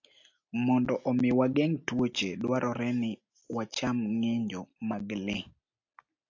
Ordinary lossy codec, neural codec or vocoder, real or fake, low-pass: AAC, 48 kbps; none; real; 7.2 kHz